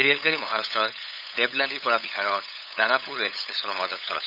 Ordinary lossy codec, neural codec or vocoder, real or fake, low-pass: none; codec, 16 kHz, 8 kbps, FunCodec, trained on LibriTTS, 25 frames a second; fake; 5.4 kHz